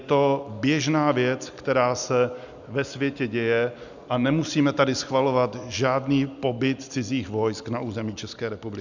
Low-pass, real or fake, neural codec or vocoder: 7.2 kHz; real; none